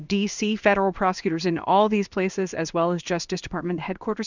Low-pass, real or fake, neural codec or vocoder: 7.2 kHz; fake; codec, 16 kHz in and 24 kHz out, 1 kbps, XY-Tokenizer